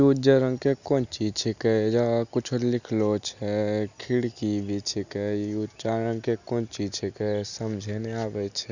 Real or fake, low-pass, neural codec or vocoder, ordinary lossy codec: real; 7.2 kHz; none; none